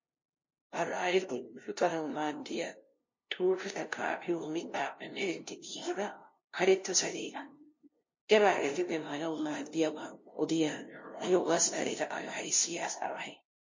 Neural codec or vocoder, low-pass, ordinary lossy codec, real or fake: codec, 16 kHz, 0.5 kbps, FunCodec, trained on LibriTTS, 25 frames a second; 7.2 kHz; MP3, 32 kbps; fake